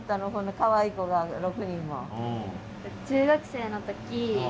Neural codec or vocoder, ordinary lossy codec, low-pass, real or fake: none; none; none; real